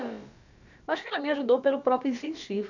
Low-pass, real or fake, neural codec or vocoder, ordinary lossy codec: 7.2 kHz; fake; codec, 16 kHz, about 1 kbps, DyCAST, with the encoder's durations; none